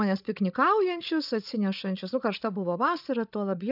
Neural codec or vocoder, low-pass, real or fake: codec, 16 kHz, 16 kbps, FreqCodec, larger model; 5.4 kHz; fake